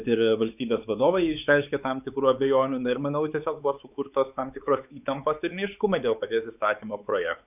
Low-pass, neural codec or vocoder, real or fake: 3.6 kHz; codec, 16 kHz, 4 kbps, X-Codec, WavLM features, trained on Multilingual LibriSpeech; fake